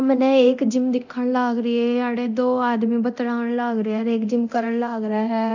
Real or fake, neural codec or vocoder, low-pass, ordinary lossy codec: fake; codec, 24 kHz, 0.9 kbps, DualCodec; 7.2 kHz; none